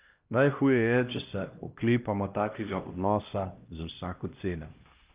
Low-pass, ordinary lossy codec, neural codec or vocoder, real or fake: 3.6 kHz; Opus, 24 kbps; codec, 16 kHz, 1 kbps, X-Codec, HuBERT features, trained on LibriSpeech; fake